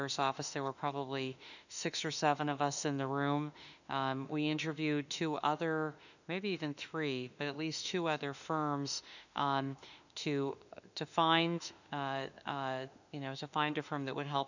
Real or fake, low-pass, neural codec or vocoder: fake; 7.2 kHz; autoencoder, 48 kHz, 32 numbers a frame, DAC-VAE, trained on Japanese speech